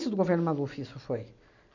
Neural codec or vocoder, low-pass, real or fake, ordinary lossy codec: none; 7.2 kHz; real; none